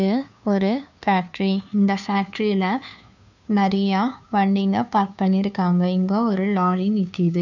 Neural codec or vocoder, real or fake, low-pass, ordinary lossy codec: codec, 16 kHz, 2 kbps, FunCodec, trained on Chinese and English, 25 frames a second; fake; 7.2 kHz; none